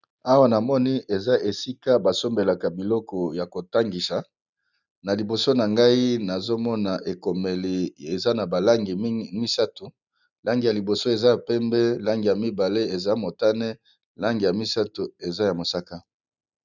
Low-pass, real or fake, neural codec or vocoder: 7.2 kHz; real; none